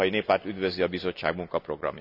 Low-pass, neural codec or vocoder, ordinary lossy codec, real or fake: 5.4 kHz; none; none; real